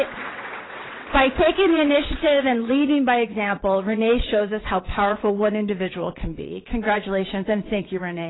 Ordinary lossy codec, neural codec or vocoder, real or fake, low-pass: AAC, 16 kbps; vocoder, 22.05 kHz, 80 mel bands, WaveNeXt; fake; 7.2 kHz